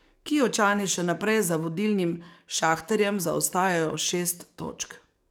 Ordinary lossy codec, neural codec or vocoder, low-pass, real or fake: none; codec, 44.1 kHz, 7.8 kbps, DAC; none; fake